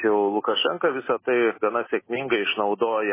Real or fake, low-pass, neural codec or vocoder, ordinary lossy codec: real; 3.6 kHz; none; MP3, 16 kbps